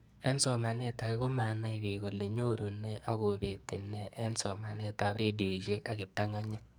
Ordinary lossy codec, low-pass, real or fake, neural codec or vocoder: none; none; fake; codec, 44.1 kHz, 2.6 kbps, SNAC